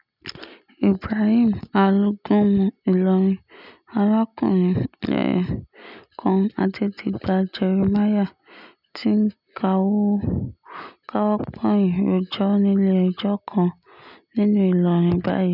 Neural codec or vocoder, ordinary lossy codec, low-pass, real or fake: none; none; 5.4 kHz; real